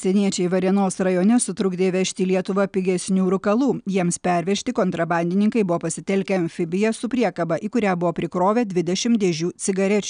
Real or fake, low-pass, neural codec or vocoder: real; 9.9 kHz; none